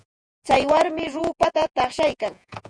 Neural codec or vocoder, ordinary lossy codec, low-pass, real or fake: vocoder, 48 kHz, 128 mel bands, Vocos; MP3, 96 kbps; 9.9 kHz; fake